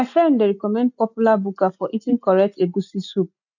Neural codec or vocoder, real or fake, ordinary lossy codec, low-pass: none; real; none; 7.2 kHz